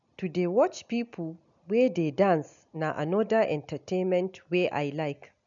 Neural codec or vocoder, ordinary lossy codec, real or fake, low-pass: none; none; real; 7.2 kHz